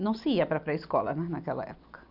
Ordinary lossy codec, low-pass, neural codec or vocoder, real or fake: none; 5.4 kHz; none; real